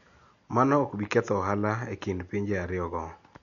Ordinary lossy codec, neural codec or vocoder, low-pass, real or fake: none; none; 7.2 kHz; real